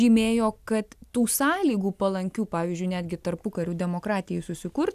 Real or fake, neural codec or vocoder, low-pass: real; none; 14.4 kHz